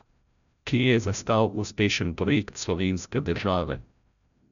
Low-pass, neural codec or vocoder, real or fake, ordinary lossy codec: 7.2 kHz; codec, 16 kHz, 0.5 kbps, FreqCodec, larger model; fake; MP3, 96 kbps